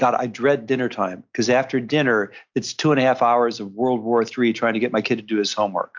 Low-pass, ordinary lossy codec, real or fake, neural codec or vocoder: 7.2 kHz; MP3, 64 kbps; real; none